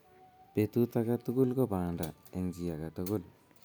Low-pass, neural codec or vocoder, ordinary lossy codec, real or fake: none; none; none; real